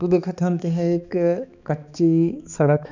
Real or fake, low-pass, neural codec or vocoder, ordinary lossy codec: fake; 7.2 kHz; codec, 16 kHz, 2 kbps, X-Codec, HuBERT features, trained on balanced general audio; none